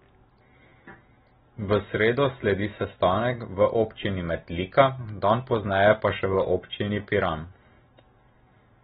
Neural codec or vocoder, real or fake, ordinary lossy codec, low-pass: none; real; AAC, 16 kbps; 19.8 kHz